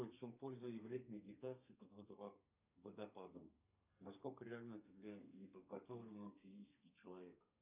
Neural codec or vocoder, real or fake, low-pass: codec, 32 kHz, 1.9 kbps, SNAC; fake; 3.6 kHz